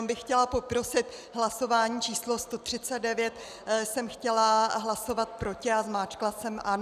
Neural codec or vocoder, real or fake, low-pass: none; real; 14.4 kHz